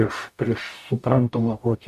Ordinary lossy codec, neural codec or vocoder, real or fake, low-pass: MP3, 64 kbps; codec, 44.1 kHz, 0.9 kbps, DAC; fake; 14.4 kHz